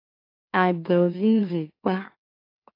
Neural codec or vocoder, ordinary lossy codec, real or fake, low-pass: autoencoder, 44.1 kHz, a latent of 192 numbers a frame, MeloTTS; AAC, 24 kbps; fake; 5.4 kHz